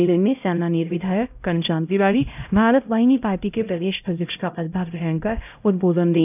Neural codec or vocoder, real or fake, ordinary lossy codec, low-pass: codec, 16 kHz, 0.5 kbps, X-Codec, HuBERT features, trained on LibriSpeech; fake; none; 3.6 kHz